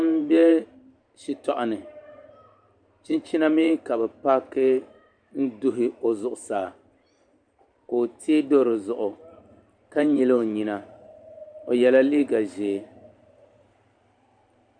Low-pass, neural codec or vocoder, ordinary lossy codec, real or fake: 9.9 kHz; vocoder, 44.1 kHz, 128 mel bands every 512 samples, BigVGAN v2; MP3, 96 kbps; fake